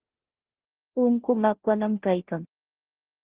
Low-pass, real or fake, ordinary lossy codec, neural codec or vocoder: 3.6 kHz; fake; Opus, 16 kbps; codec, 16 kHz, 0.5 kbps, FunCodec, trained on Chinese and English, 25 frames a second